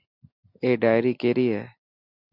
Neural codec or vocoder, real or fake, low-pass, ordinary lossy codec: none; real; 5.4 kHz; MP3, 48 kbps